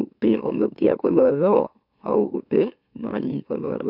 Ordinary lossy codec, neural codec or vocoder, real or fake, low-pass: none; autoencoder, 44.1 kHz, a latent of 192 numbers a frame, MeloTTS; fake; 5.4 kHz